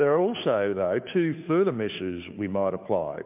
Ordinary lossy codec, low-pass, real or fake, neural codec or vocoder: MP3, 32 kbps; 3.6 kHz; fake; codec, 16 kHz, 2 kbps, FunCodec, trained on Chinese and English, 25 frames a second